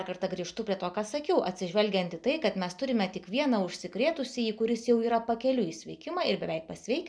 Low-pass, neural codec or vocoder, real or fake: 9.9 kHz; none; real